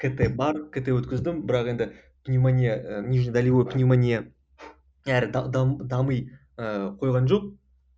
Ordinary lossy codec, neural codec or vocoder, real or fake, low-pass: none; none; real; none